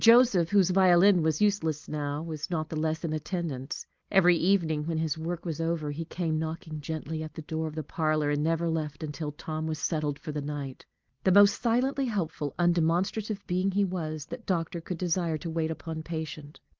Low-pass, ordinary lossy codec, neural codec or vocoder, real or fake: 7.2 kHz; Opus, 32 kbps; none; real